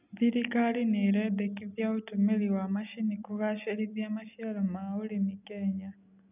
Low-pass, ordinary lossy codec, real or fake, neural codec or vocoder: 3.6 kHz; none; real; none